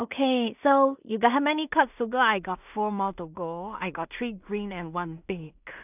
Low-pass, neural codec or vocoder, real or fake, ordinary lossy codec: 3.6 kHz; codec, 16 kHz in and 24 kHz out, 0.4 kbps, LongCat-Audio-Codec, two codebook decoder; fake; none